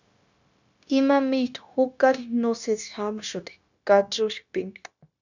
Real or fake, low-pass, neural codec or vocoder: fake; 7.2 kHz; codec, 16 kHz, 0.9 kbps, LongCat-Audio-Codec